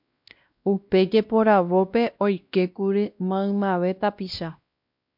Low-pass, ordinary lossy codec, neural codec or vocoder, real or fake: 5.4 kHz; MP3, 48 kbps; codec, 16 kHz, 1 kbps, X-Codec, WavLM features, trained on Multilingual LibriSpeech; fake